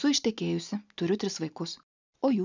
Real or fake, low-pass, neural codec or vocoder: real; 7.2 kHz; none